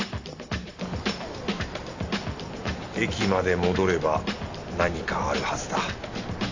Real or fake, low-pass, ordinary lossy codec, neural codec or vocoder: real; 7.2 kHz; AAC, 48 kbps; none